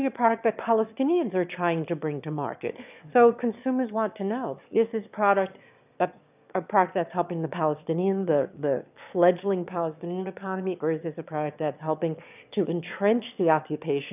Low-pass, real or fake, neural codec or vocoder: 3.6 kHz; fake; autoencoder, 22.05 kHz, a latent of 192 numbers a frame, VITS, trained on one speaker